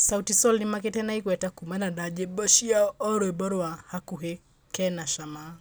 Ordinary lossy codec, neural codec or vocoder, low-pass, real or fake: none; none; none; real